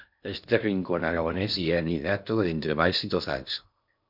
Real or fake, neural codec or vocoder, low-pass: fake; codec, 16 kHz in and 24 kHz out, 0.6 kbps, FocalCodec, streaming, 2048 codes; 5.4 kHz